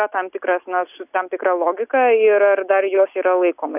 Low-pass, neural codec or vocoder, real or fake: 3.6 kHz; none; real